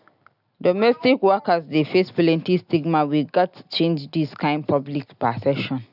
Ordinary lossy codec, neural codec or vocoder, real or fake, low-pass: none; none; real; 5.4 kHz